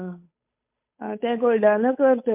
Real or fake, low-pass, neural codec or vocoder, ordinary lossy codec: fake; 3.6 kHz; codec, 16 kHz, 8 kbps, FunCodec, trained on Chinese and English, 25 frames a second; MP3, 32 kbps